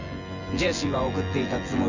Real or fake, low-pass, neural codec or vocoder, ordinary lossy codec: fake; 7.2 kHz; vocoder, 24 kHz, 100 mel bands, Vocos; none